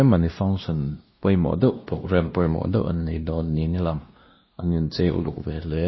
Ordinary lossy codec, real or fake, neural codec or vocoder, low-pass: MP3, 24 kbps; fake; codec, 16 kHz, 1 kbps, X-Codec, WavLM features, trained on Multilingual LibriSpeech; 7.2 kHz